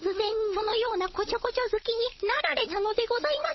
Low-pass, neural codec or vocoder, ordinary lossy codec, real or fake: 7.2 kHz; codec, 16 kHz, 16 kbps, FunCodec, trained on LibriTTS, 50 frames a second; MP3, 24 kbps; fake